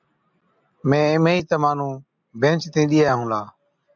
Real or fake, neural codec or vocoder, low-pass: real; none; 7.2 kHz